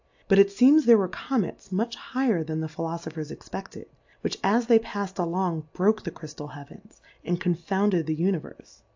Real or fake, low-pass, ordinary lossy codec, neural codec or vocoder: real; 7.2 kHz; AAC, 48 kbps; none